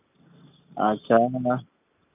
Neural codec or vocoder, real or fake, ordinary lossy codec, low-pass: none; real; none; 3.6 kHz